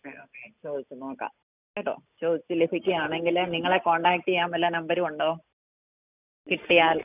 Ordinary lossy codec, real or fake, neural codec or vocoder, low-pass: none; real; none; 3.6 kHz